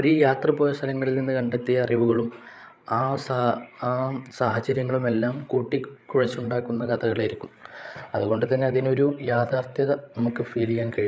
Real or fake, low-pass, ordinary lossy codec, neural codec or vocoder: fake; none; none; codec, 16 kHz, 8 kbps, FreqCodec, larger model